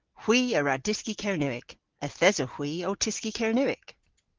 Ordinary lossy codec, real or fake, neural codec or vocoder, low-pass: Opus, 16 kbps; real; none; 7.2 kHz